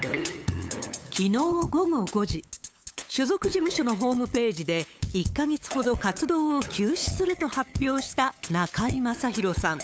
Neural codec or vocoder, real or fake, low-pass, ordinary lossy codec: codec, 16 kHz, 8 kbps, FunCodec, trained on LibriTTS, 25 frames a second; fake; none; none